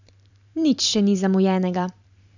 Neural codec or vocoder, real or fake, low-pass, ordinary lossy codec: vocoder, 44.1 kHz, 128 mel bands every 512 samples, BigVGAN v2; fake; 7.2 kHz; none